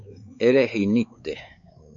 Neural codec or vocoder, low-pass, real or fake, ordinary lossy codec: codec, 16 kHz, 4 kbps, X-Codec, WavLM features, trained on Multilingual LibriSpeech; 7.2 kHz; fake; MP3, 64 kbps